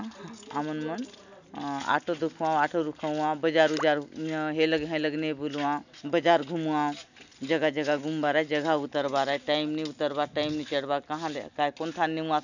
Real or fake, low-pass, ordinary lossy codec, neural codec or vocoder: real; 7.2 kHz; none; none